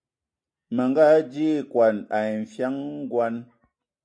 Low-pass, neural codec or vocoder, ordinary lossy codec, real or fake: 9.9 kHz; none; MP3, 64 kbps; real